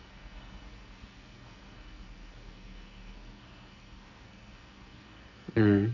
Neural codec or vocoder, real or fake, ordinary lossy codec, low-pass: codec, 44.1 kHz, 2.6 kbps, SNAC; fake; none; 7.2 kHz